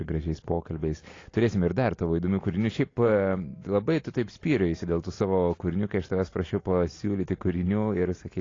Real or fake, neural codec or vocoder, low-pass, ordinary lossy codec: real; none; 7.2 kHz; AAC, 32 kbps